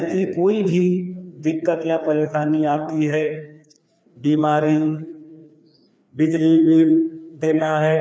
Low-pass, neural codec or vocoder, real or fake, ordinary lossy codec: none; codec, 16 kHz, 2 kbps, FreqCodec, larger model; fake; none